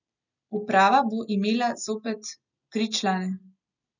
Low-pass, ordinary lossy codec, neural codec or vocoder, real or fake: 7.2 kHz; none; none; real